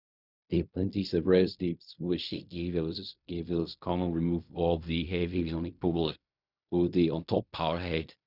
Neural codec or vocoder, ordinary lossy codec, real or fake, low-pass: codec, 16 kHz in and 24 kHz out, 0.4 kbps, LongCat-Audio-Codec, fine tuned four codebook decoder; none; fake; 5.4 kHz